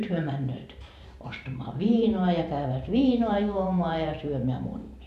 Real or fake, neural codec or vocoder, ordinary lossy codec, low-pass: fake; vocoder, 44.1 kHz, 128 mel bands every 512 samples, BigVGAN v2; none; 14.4 kHz